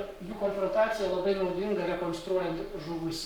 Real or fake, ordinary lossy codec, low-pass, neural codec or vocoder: fake; Opus, 64 kbps; 19.8 kHz; codec, 44.1 kHz, 7.8 kbps, Pupu-Codec